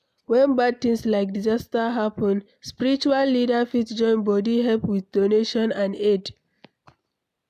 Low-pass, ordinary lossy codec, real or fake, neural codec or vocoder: 14.4 kHz; none; real; none